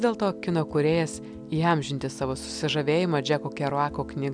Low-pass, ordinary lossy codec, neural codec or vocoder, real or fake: 9.9 kHz; MP3, 96 kbps; none; real